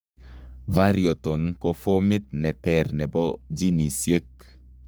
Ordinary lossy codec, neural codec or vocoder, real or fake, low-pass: none; codec, 44.1 kHz, 3.4 kbps, Pupu-Codec; fake; none